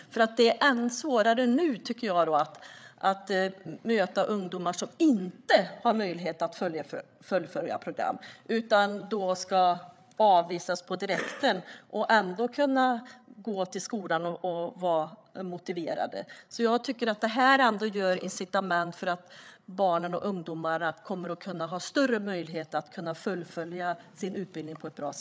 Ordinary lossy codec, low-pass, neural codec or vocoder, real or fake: none; none; codec, 16 kHz, 8 kbps, FreqCodec, larger model; fake